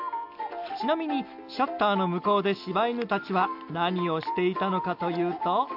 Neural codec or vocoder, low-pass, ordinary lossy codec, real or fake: none; 5.4 kHz; AAC, 48 kbps; real